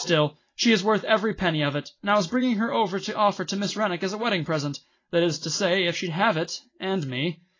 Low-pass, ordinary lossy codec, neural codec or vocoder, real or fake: 7.2 kHz; AAC, 32 kbps; none; real